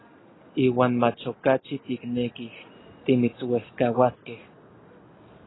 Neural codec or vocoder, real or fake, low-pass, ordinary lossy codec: none; real; 7.2 kHz; AAC, 16 kbps